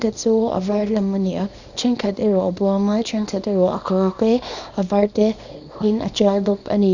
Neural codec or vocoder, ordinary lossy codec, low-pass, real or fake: codec, 24 kHz, 0.9 kbps, WavTokenizer, small release; none; 7.2 kHz; fake